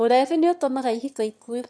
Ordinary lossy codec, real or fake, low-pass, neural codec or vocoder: none; fake; none; autoencoder, 22.05 kHz, a latent of 192 numbers a frame, VITS, trained on one speaker